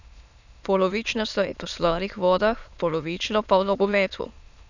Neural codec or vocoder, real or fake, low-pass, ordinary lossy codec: autoencoder, 22.05 kHz, a latent of 192 numbers a frame, VITS, trained on many speakers; fake; 7.2 kHz; none